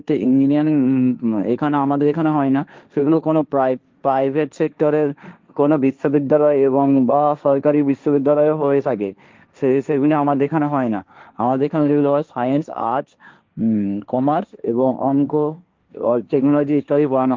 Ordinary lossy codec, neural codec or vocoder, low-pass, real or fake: Opus, 24 kbps; codec, 16 kHz, 1.1 kbps, Voila-Tokenizer; 7.2 kHz; fake